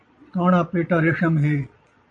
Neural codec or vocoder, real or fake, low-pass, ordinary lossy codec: vocoder, 22.05 kHz, 80 mel bands, Vocos; fake; 9.9 kHz; MP3, 48 kbps